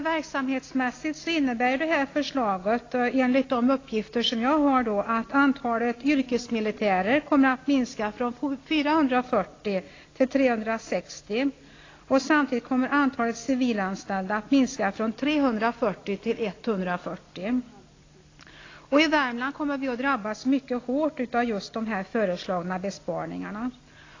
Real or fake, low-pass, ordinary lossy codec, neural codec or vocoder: real; 7.2 kHz; AAC, 32 kbps; none